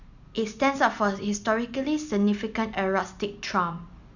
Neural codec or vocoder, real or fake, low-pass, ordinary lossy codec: none; real; 7.2 kHz; none